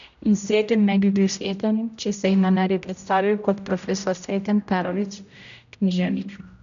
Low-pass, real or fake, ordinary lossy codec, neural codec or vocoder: 7.2 kHz; fake; none; codec, 16 kHz, 0.5 kbps, X-Codec, HuBERT features, trained on general audio